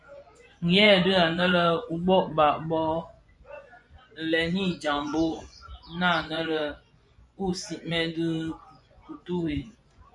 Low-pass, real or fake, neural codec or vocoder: 10.8 kHz; fake; vocoder, 24 kHz, 100 mel bands, Vocos